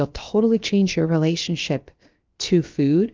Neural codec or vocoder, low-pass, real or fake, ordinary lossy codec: codec, 16 kHz, about 1 kbps, DyCAST, with the encoder's durations; 7.2 kHz; fake; Opus, 24 kbps